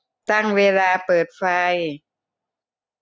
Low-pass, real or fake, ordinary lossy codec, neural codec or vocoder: none; real; none; none